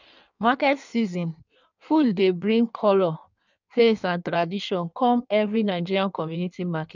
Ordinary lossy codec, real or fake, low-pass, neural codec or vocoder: none; fake; 7.2 kHz; codec, 16 kHz in and 24 kHz out, 1.1 kbps, FireRedTTS-2 codec